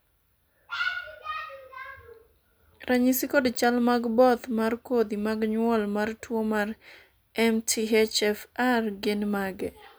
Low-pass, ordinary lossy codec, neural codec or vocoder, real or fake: none; none; none; real